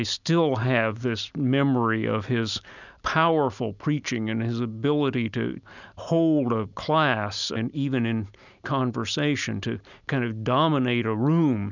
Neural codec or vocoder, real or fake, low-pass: none; real; 7.2 kHz